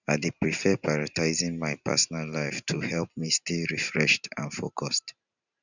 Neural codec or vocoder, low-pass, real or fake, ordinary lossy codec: vocoder, 44.1 kHz, 128 mel bands every 512 samples, BigVGAN v2; 7.2 kHz; fake; none